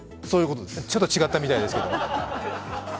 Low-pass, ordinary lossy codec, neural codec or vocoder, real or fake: none; none; none; real